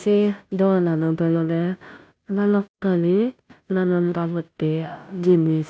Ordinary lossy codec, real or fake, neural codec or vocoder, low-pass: none; fake; codec, 16 kHz, 0.5 kbps, FunCodec, trained on Chinese and English, 25 frames a second; none